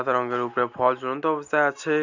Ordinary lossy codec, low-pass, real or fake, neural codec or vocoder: none; 7.2 kHz; real; none